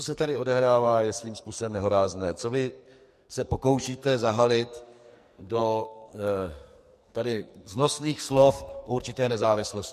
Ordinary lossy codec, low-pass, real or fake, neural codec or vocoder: MP3, 64 kbps; 14.4 kHz; fake; codec, 44.1 kHz, 2.6 kbps, SNAC